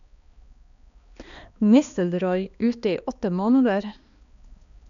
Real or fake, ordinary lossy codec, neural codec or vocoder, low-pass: fake; none; codec, 16 kHz, 2 kbps, X-Codec, HuBERT features, trained on balanced general audio; 7.2 kHz